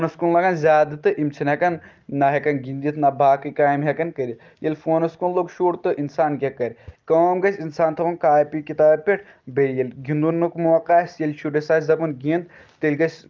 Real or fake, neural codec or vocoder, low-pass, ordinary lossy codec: real; none; 7.2 kHz; Opus, 32 kbps